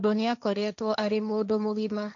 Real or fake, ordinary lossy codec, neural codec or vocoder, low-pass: fake; none; codec, 16 kHz, 1.1 kbps, Voila-Tokenizer; 7.2 kHz